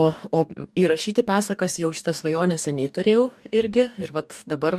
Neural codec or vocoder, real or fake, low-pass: codec, 44.1 kHz, 2.6 kbps, DAC; fake; 14.4 kHz